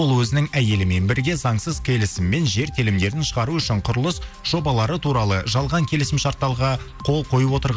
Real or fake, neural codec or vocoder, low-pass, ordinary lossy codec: real; none; none; none